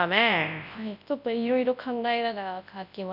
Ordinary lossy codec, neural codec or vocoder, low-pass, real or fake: none; codec, 24 kHz, 0.9 kbps, WavTokenizer, large speech release; 5.4 kHz; fake